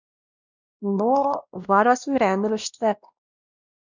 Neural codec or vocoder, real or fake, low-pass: codec, 16 kHz, 1 kbps, X-Codec, WavLM features, trained on Multilingual LibriSpeech; fake; 7.2 kHz